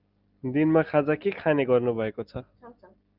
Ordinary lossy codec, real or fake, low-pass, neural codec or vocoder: Opus, 24 kbps; real; 5.4 kHz; none